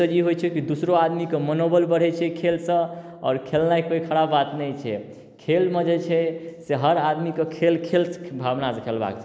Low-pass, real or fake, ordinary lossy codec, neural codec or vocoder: none; real; none; none